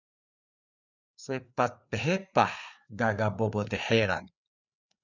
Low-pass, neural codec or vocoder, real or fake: 7.2 kHz; codec, 16 kHz, 4 kbps, FreqCodec, larger model; fake